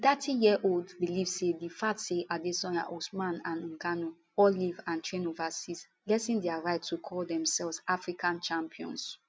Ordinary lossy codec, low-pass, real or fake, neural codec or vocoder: none; none; real; none